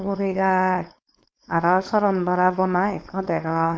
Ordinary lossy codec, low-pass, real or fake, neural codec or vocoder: none; none; fake; codec, 16 kHz, 4.8 kbps, FACodec